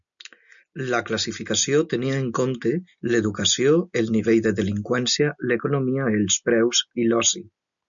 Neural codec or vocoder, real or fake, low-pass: none; real; 7.2 kHz